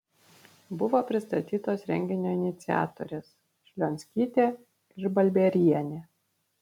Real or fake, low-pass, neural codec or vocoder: real; 19.8 kHz; none